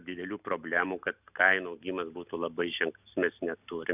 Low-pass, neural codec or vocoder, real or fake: 3.6 kHz; none; real